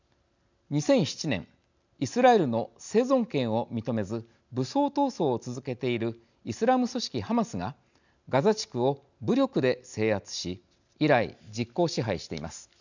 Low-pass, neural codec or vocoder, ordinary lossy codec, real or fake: 7.2 kHz; none; none; real